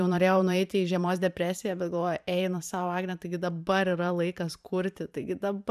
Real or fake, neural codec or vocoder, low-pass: fake; vocoder, 44.1 kHz, 128 mel bands every 512 samples, BigVGAN v2; 14.4 kHz